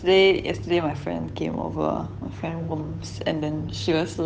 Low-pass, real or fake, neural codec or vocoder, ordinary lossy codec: none; fake; codec, 16 kHz, 8 kbps, FunCodec, trained on Chinese and English, 25 frames a second; none